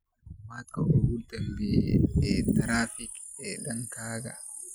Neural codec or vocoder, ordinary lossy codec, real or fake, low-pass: none; none; real; none